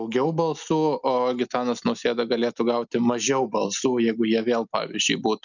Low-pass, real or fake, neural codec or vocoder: 7.2 kHz; real; none